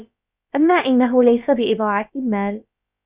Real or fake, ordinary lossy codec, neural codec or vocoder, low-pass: fake; Opus, 64 kbps; codec, 16 kHz, about 1 kbps, DyCAST, with the encoder's durations; 3.6 kHz